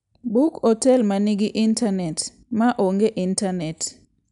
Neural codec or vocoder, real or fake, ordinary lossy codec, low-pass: none; real; none; 10.8 kHz